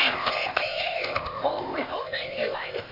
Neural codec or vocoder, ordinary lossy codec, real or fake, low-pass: codec, 16 kHz, 0.8 kbps, ZipCodec; MP3, 32 kbps; fake; 5.4 kHz